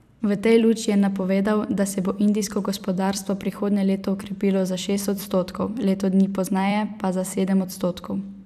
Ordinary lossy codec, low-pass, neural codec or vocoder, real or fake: none; 14.4 kHz; vocoder, 44.1 kHz, 128 mel bands every 256 samples, BigVGAN v2; fake